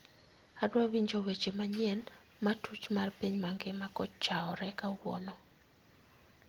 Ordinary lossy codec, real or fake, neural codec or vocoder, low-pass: Opus, 24 kbps; real; none; 19.8 kHz